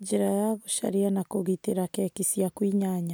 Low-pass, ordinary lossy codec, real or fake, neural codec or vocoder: none; none; real; none